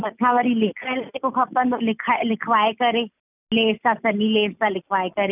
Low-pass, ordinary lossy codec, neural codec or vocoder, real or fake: 3.6 kHz; none; none; real